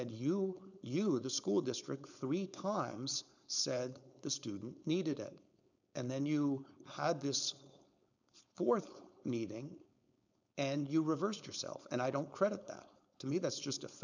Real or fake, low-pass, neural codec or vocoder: fake; 7.2 kHz; codec, 16 kHz, 4.8 kbps, FACodec